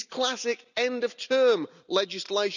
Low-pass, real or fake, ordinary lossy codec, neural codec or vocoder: 7.2 kHz; real; none; none